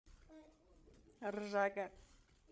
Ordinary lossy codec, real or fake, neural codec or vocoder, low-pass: none; fake; codec, 16 kHz, 16 kbps, FreqCodec, larger model; none